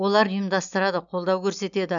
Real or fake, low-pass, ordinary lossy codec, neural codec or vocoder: real; 7.2 kHz; none; none